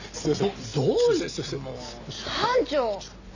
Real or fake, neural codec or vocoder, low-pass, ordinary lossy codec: real; none; 7.2 kHz; none